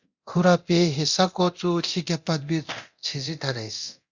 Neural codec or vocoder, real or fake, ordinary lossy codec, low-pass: codec, 24 kHz, 0.9 kbps, DualCodec; fake; Opus, 64 kbps; 7.2 kHz